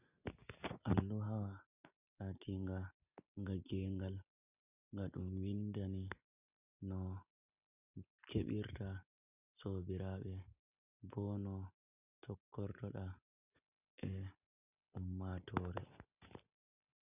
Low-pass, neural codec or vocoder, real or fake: 3.6 kHz; none; real